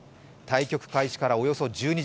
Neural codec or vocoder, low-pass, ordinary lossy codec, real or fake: none; none; none; real